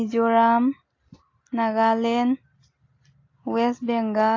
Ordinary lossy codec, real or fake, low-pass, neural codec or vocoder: AAC, 32 kbps; real; 7.2 kHz; none